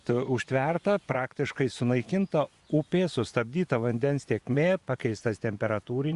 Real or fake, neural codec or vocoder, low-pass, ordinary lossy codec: fake; vocoder, 24 kHz, 100 mel bands, Vocos; 10.8 kHz; Opus, 64 kbps